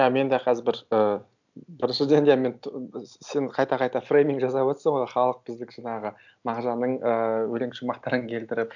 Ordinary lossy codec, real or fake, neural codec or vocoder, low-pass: none; real; none; 7.2 kHz